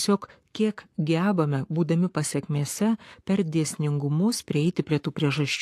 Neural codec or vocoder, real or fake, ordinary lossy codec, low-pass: codec, 44.1 kHz, 7.8 kbps, Pupu-Codec; fake; AAC, 64 kbps; 14.4 kHz